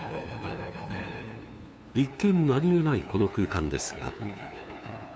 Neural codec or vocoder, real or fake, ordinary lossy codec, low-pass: codec, 16 kHz, 2 kbps, FunCodec, trained on LibriTTS, 25 frames a second; fake; none; none